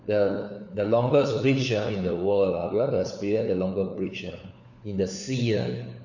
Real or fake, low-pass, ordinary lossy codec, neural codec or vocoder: fake; 7.2 kHz; none; codec, 16 kHz, 4 kbps, FunCodec, trained on LibriTTS, 50 frames a second